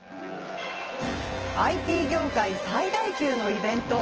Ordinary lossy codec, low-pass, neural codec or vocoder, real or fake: Opus, 16 kbps; 7.2 kHz; vocoder, 24 kHz, 100 mel bands, Vocos; fake